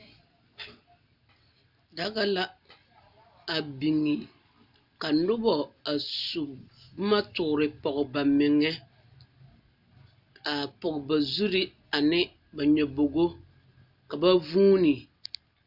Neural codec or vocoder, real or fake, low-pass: none; real; 5.4 kHz